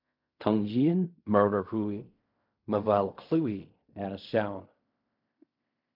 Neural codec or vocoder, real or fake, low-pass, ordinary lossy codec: codec, 16 kHz in and 24 kHz out, 0.4 kbps, LongCat-Audio-Codec, fine tuned four codebook decoder; fake; 5.4 kHz; MP3, 48 kbps